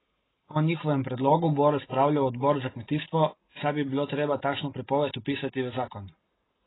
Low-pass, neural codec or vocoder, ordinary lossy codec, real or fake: 7.2 kHz; none; AAC, 16 kbps; real